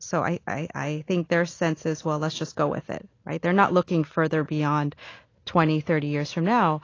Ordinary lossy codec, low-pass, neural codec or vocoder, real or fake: AAC, 32 kbps; 7.2 kHz; none; real